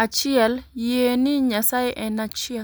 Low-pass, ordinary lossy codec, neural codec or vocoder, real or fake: none; none; none; real